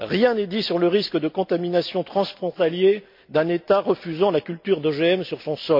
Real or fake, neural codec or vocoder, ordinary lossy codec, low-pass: real; none; MP3, 48 kbps; 5.4 kHz